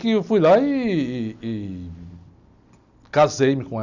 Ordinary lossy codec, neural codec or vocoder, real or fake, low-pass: none; none; real; 7.2 kHz